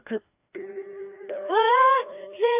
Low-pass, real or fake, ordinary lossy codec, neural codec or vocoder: 3.6 kHz; fake; AAC, 32 kbps; codec, 16 kHz, 2 kbps, FreqCodec, larger model